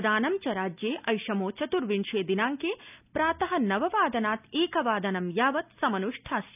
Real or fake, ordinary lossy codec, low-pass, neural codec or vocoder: real; none; 3.6 kHz; none